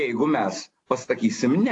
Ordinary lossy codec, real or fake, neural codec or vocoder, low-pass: AAC, 48 kbps; real; none; 10.8 kHz